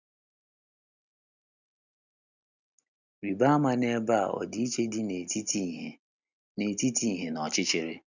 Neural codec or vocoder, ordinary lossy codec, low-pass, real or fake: none; none; 7.2 kHz; real